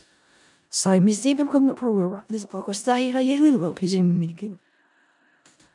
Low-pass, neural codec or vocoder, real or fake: 10.8 kHz; codec, 16 kHz in and 24 kHz out, 0.4 kbps, LongCat-Audio-Codec, four codebook decoder; fake